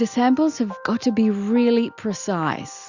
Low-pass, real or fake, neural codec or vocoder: 7.2 kHz; real; none